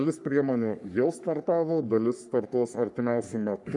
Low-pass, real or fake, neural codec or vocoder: 10.8 kHz; fake; codec, 44.1 kHz, 3.4 kbps, Pupu-Codec